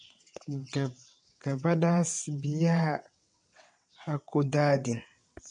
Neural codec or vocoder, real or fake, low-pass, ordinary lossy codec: vocoder, 22.05 kHz, 80 mel bands, WaveNeXt; fake; 9.9 kHz; MP3, 48 kbps